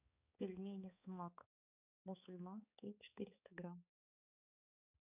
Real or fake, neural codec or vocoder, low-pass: fake; codec, 16 kHz, 4 kbps, X-Codec, HuBERT features, trained on balanced general audio; 3.6 kHz